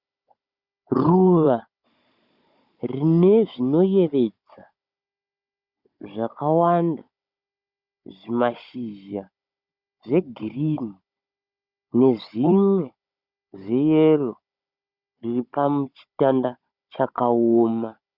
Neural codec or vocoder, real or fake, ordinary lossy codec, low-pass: codec, 16 kHz, 16 kbps, FunCodec, trained on Chinese and English, 50 frames a second; fake; Opus, 64 kbps; 5.4 kHz